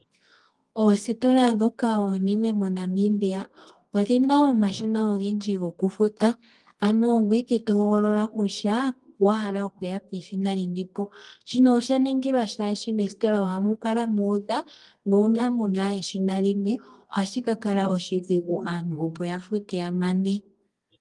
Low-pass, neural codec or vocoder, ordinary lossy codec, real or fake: 10.8 kHz; codec, 24 kHz, 0.9 kbps, WavTokenizer, medium music audio release; Opus, 24 kbps; fake